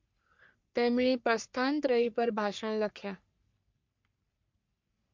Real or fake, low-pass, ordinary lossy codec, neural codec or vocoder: fake; 7.2 kHz; MP3, 48 kbps; codec, 44.1 kHz, 3.4 kbps, Pupu-Codec